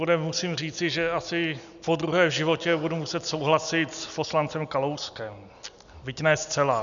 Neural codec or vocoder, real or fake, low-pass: none; real; 7.2 kHz